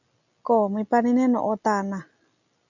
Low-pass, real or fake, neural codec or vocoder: 7.2 kHz; real; none